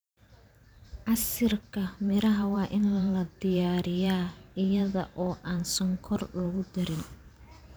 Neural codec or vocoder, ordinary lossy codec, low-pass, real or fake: vocoder, 44.1 kHz, 128 mel bands every 512 samples, BigVGAN v2; none; none; fake